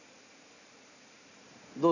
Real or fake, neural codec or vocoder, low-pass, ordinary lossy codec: real; none; 7.2 kHz; none